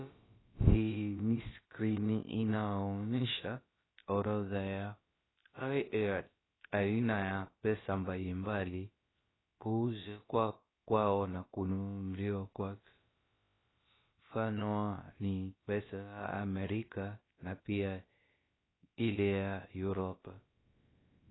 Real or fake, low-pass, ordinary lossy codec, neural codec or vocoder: fake; 7.2 kHz; AAC, 16 kbps; codec, 16 kHz, about 1 kbps, DyCAST, with the encoder's durations